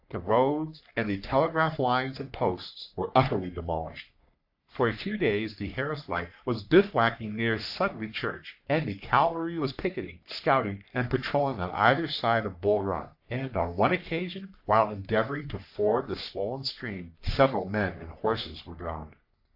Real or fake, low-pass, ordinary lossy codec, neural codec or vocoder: fake; 5.4 kHz; AAC, 48 kbps; codec, 44.1 kHz, 3.4 kbps, Pupu-Codec